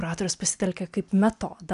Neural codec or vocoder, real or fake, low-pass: none; real; 10.8 kHz